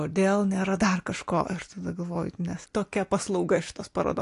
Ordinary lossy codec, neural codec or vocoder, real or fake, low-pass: AAC, 48 kbps; none; real; 10.8 kHz